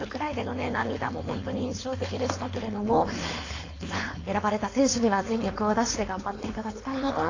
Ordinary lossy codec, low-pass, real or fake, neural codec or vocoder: AAC, 32 kbps; 7.2 kHz; fake; codec, 16 kHz, 4.8 kbps, FACodec